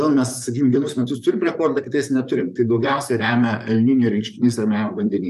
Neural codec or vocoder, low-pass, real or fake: vocoder, 44.1 kHz, 128 mel bands, Pupu-Vocoder; 14.4 kHz; fake